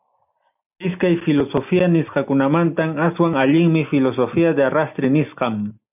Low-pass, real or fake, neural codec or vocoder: 3.6 kHz; real; none